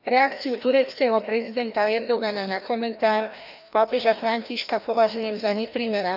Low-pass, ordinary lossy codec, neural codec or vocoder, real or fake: 5.4 kHz; none; codec, 16 kHz, 1 kbps, FreqCodec, larger model; fake